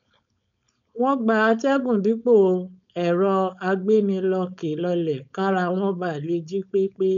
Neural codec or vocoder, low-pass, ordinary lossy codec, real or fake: codec, 16 kHz, 4.8 kbps, FACodec; 7.2 kHz; none; fake